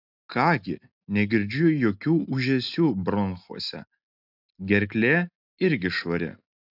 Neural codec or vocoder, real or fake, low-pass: none; real; 5.4 kHz